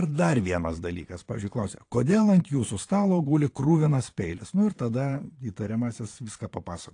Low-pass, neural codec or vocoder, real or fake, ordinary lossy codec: 9.9 kHz; vocoder, 22.05 kHz, 80 mel bands, Vocos; fake; AAC, 48 kbps